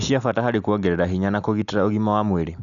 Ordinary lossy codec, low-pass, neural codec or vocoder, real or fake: none; 7.2 kHz; none; real